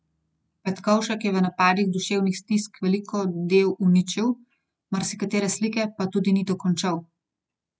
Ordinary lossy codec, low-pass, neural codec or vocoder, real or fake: none; none; none; real